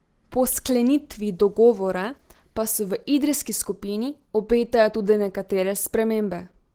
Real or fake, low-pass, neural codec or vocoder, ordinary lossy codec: real; 19.8 kHz; none; Opus, 16 kbps